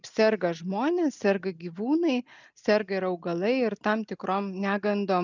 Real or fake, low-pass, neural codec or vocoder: real; 7.2 kHz; none